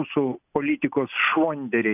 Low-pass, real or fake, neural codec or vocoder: 3.6 kHz; real; none